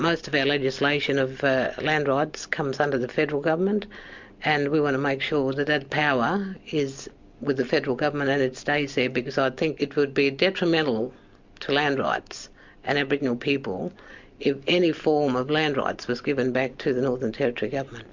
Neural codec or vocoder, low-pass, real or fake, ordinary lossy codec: none; 7.2 kHz; real; MP3, 64 kbps